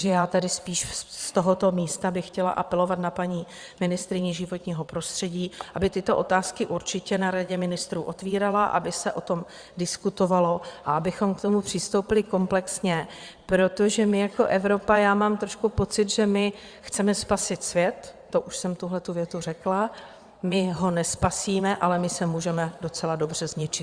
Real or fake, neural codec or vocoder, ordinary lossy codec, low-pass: fake; vocoder, 22.05 kHz, 80 mel bands, Vocos; Opus, 64 kbps; 9.9 kHz